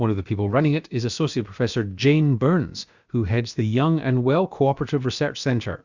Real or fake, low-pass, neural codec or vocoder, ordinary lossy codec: fake; 7.2 kHz; codec, 16 kHz, about 1 kbps, DyCAST, with the encoder's durations; Opus, 64 kbps